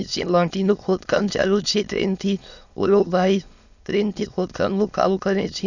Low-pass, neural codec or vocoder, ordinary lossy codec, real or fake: 7.2 kHz; autoencoder, 22.05 kHz, a latent of 192 numbers a frame, VITS, trained on many speakers; none; fake